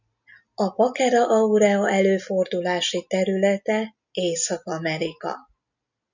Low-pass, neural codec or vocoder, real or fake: 7.2 kHz; none; real